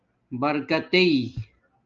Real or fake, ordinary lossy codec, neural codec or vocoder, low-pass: real; Opus, 24 kbps; none; 7.2 kHz